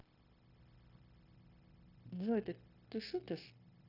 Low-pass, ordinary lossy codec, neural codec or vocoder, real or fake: 5.4 kHz; none; codec, 16 kHz, 0.9 kbps, LongCat-Audio-Codec; fake